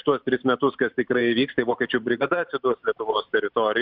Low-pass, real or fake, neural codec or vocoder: 5.4 kHz; fake; vocoder, 44.1 kHz, 128 mel bands every 512 samples, BigVGAN v2